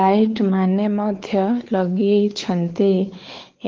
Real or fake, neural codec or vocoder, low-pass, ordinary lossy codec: fake; codec, 16 kHz, 4 kbps, X-Codec, WavLM features, trained on Multilingual LibriSpeech; 7.2 kHz; Opus, 16 kbps